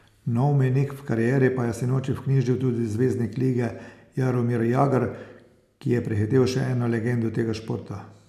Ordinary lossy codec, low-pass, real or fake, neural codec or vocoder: none; 14.4 kHz; real; none